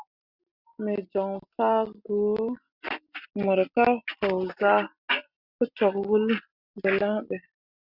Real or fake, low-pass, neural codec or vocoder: real; 5.4 kHz; none